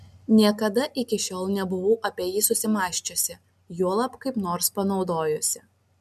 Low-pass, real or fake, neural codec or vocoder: 14.4 kHz; real; none